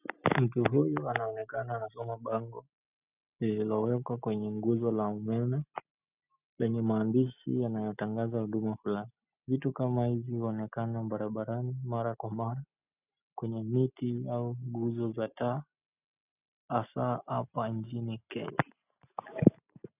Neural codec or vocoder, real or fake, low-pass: none; real; 3.6 kHz